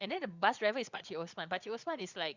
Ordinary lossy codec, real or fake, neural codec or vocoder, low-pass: Opus, 64 kbps; fake; vocoder, 22.05 kHz, 80 mel bands, Vocos; 7.2 kHz